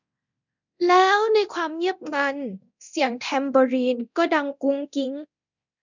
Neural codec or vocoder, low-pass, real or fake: codec, 24 kHz, 0.9 kbps, DualCodec; 7.2 kHz; fake